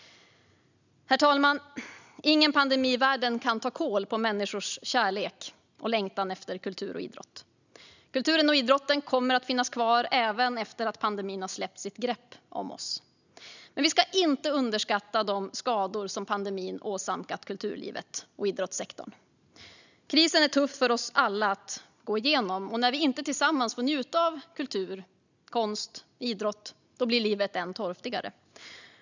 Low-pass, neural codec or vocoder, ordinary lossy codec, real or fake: 7.2 kHz; none; none; real